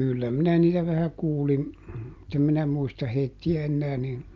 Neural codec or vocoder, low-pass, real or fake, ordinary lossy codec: none; 7.2 kHz; real; Opus, 24 kbps